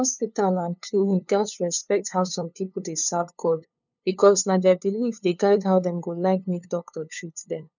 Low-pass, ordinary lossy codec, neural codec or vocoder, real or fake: 7.2 kHz; none; codec, 16 kHz, 2 kbps, FunCodec, trained on LibriTTS, 25 frames a second; fake